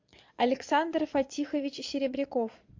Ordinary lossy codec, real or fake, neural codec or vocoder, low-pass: MP3, 48 kbps; fake; vocoder, 22.05 kHz, 80 mel bands, Vocos; 7.2 kHz